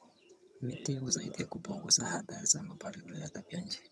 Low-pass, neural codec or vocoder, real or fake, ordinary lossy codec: none; vocoder, 22.05 kHz, 80 mel bands, HiFi-GAN; fake; none